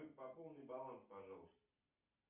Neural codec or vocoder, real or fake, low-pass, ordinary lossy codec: none; real; 3.6 kHz; Opus, 64 kbps